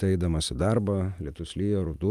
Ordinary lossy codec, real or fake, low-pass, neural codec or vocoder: Opus, 32 kbps; real; 14.4 kHz; none